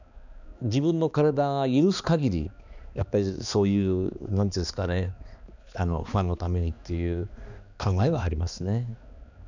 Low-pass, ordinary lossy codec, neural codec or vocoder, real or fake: 7.2 kHz; none; codec, 16 kHz, 2 kbps, X-Codec, HuBERT features, trained on balanced general audio; fake